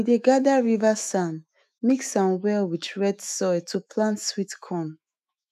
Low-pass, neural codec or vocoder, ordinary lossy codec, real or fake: 14.4 kHz; autoencoder, 48 kHz, 128 numbers a frame, DAC-VAE, trained on Japanese speech; none; fake